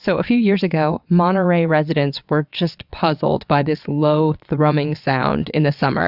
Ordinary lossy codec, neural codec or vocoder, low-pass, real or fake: Opus, 64 kbps; vocoder, 22.05 kHz, 80 mel bands, WaveNeXt; 5.4 kHz; fake